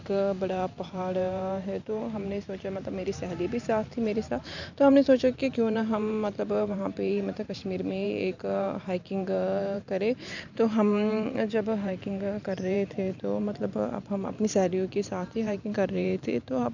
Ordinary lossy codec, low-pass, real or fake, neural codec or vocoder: none; 7.2 kHz; fake; vocoder, 44.1 kHz, 128 mel bands every 512 samples, BigVGAN v2